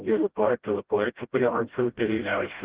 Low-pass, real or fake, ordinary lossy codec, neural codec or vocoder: 3.6 kHz; fake; Opus, 16 kbps; codec, 16 kHz, 0.5 kbps, FreqCodec, smaller model